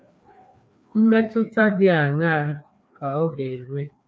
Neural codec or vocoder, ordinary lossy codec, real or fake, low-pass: codec, 16 kHz, 2 kbps, FreqCodec, larger model; none; fake; none